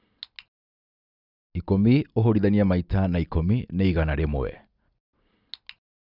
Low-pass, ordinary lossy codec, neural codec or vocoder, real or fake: 5.4 kHz; Opus, 64 kbps; none; real